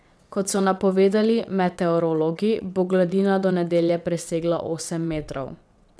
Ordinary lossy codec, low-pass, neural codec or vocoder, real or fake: none; none; vocoder, 22.05 kHz, 80 mel bands, WaveNeXt; fake